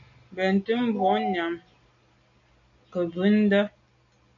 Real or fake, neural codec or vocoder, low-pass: real; none; 7.2 kHz